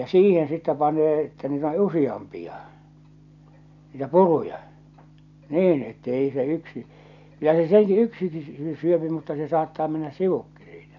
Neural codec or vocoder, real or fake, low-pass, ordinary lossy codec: none; real; 7.2 kHz; none